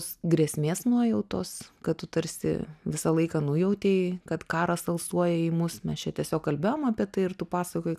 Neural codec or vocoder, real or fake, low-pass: none; real; 14.4 kHz